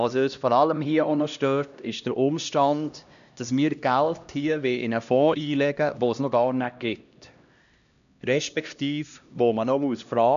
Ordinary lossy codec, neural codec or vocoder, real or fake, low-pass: none; codec, 16 kHz, 1 kbps, X-Codec, HuBERT features, trained on LibriSpeech; fake; 7.2 kHz